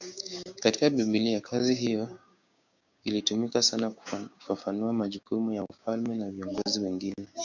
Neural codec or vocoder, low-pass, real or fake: codec, 16 kHz, 6 kbps, DAC; 7.2 kHz; fake